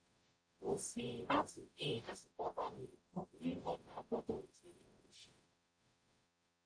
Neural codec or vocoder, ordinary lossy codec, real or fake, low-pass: codec, 44.1 kHz, 0.9 kbps, DAC; AAC, 64 kbps; fake; 9.9 kHz